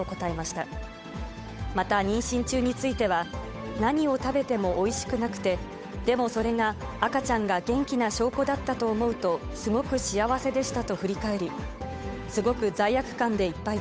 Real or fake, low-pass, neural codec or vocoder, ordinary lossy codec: fake; none; codec, 16 kHz, 8 kbps, FunCodec, trained on Chinese and English, 25 frames a second; none